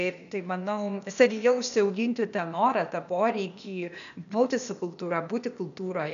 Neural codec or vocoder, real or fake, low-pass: codec, 16 kHz, 0.8 kbps, ZipCodec; fake; 7.2 kHz